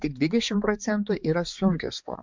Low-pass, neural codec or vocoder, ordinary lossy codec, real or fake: 7.2 kHz; codec, 16 kHz, 4 kbps, X-Codec, HuBERT features, trained on balanced general audio; MP3, 64 kbps; fake